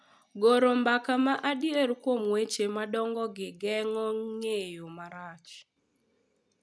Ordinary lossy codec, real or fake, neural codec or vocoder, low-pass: none; real; none; none